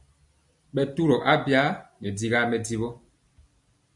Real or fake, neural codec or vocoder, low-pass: real; none; 10.8 kHz